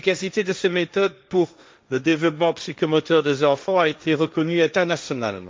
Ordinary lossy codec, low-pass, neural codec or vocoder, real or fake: none; none; codec, 16 kHz, 1.1 kbps, Voila-Tokenizer; fake